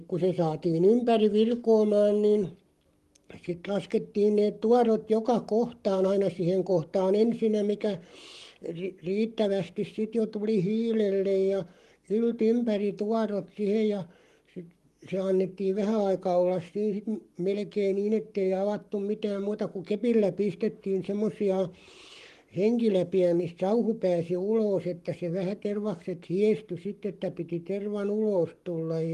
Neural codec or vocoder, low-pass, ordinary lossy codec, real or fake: codec, 44.1 kHz, 7.8 kbps, Pupu-Codec; 14.4 kHz; Opus, 24 kbps; fake